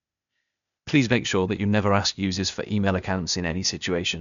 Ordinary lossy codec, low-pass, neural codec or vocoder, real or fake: none; 7.2 kHz; codec, 16 kHz, 0.8 kbps, ZipCodec; fake